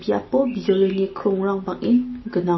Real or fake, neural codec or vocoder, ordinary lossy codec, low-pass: real; none; MP3, 24 kbps; 7.2 kHz